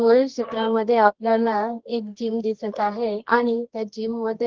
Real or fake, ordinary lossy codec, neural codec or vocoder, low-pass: fake; Opus, 16 kbps; codec, 24 kHz, 0.9 kbps, WavTokenizer, medium music audio release; 7.2 kHz